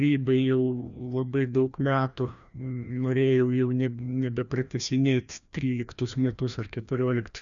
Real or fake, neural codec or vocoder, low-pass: fake; codec, 16 kHz, 1 kbps, FreqCodec, larger model; 7.2 kHz